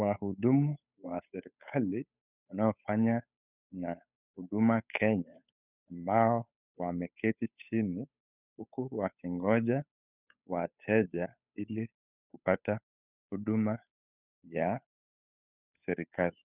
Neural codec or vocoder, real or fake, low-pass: codec, 16 kHz, 8 kbps, FunCodec, trained on Chinese and English, 25 frames a second; fake; 3.6 kHz